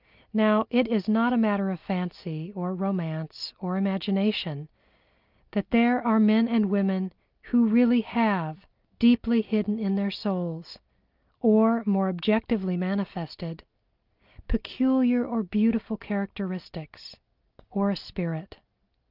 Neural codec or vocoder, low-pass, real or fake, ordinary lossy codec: none; 5.4 kHz; real; Opus, 24 kbps